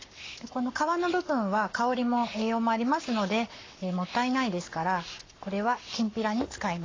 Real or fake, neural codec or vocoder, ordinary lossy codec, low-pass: fake; codec, 44.1 kHz, 7.8 kbps, Pupu-Codec; AAC, 32 kbps; 7.2 kHz